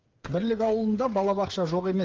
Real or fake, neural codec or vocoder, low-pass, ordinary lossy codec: fake; codec, 16 kHz, 8 kbps, FreqCodec, smaller model; 7.2 kHz; Opus, 24 kbps